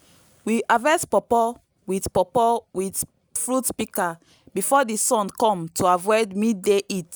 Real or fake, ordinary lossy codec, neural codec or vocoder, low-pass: real; none; none; none